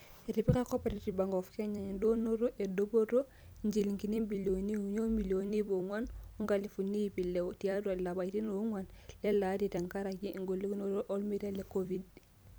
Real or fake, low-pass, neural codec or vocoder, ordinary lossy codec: fake; none; vocoder, 44.1 kHz, 128 mel bands every 256 samples, BigVGAN v2; none